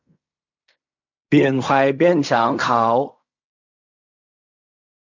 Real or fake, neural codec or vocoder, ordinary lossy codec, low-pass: fake; codec, 16 kHz in and 24 kHz out, 0.4 kbps, LongCat-Audio-Codec, fine tuned four codebook decoder; none; 7.2 kHz